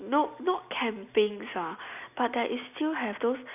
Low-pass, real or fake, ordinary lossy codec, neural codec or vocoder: 3.6 kHz; real; none; none